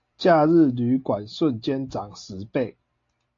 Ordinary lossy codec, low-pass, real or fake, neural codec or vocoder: AAC, 48 kbps; 7.2 kHz; real; none